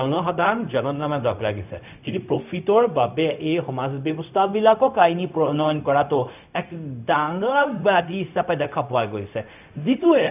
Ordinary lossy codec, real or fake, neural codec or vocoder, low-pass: none; fake; codec, 16 kHz, 0.4 kbps, LongCat-Audio-Codec; 3.6 kHz